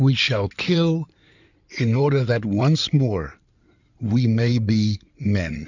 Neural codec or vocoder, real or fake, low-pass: codec, 16 kHz in and 24 kHz out, 2.2 kbps, FireRedTTS-2 codec; fake; 7.2 kHz